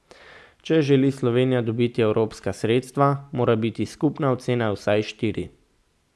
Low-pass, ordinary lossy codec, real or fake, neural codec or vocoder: none; none; real; none